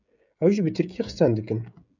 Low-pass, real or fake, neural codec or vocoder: 7.2 kHz; fake; codec, 16 kHz, 16 kbps, FreqCodec, smaller model